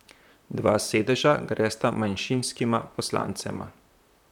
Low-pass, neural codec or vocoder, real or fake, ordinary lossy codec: 19.8 kHz; vocoder, 44.1 kHz, 128 mel bands, Pupu-Vocoder; fake; none